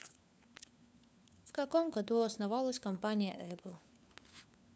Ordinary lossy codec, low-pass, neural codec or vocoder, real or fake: none; none; codec, 16 kHz, 4 kbps, FunCodec, trained on LibriTTS, 50 frames a second; fake